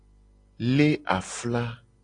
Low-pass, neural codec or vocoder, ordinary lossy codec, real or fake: 9.9 kHz; none; AAC, 48 kbps; real